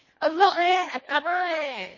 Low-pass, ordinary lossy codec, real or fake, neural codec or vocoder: 7.2 kHz; MP3, 32 kbps; fake; codec, 24 kHz, 1.5 kbps, HILCodec